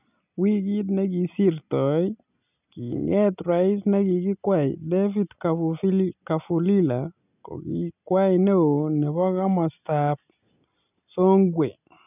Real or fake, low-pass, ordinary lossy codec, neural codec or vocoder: real; 3.6 kHz; none; none